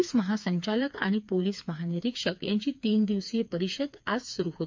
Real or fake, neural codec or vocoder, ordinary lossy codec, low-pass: fake; codec, 16 kHz, 4 kbps, FreqCodec, smaller model; MP3, 64 kbps; 7.2 kHz